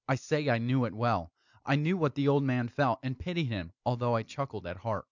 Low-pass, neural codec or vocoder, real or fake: 7.2 kHz; none; real